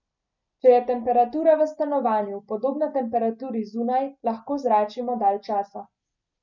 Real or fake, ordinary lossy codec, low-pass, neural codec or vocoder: real; none; 7.2 kHz; none